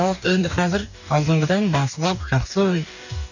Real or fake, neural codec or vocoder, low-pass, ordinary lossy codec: fake; codec, 44.1 kHz, 2.6 kbps, DAC; 7.2 kHz; none